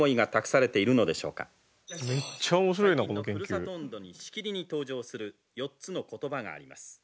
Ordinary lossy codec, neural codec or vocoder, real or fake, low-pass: none; none; real; none